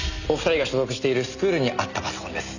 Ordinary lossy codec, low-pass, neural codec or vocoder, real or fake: none; 7.2 kHz; none; real